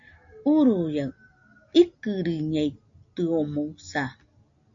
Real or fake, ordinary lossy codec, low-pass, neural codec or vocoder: real; MP3, 48 kbps; 7.2 kHz; none